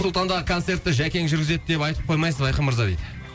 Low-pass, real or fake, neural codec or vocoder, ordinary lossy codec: none; real; none; none